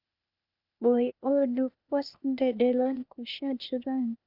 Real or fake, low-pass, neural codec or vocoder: fake; 5.4 kHz; codec, 16 kHz, 0.8 kbps, ZipCodec